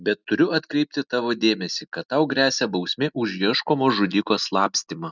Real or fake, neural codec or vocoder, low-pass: real; none; 7.2 kHz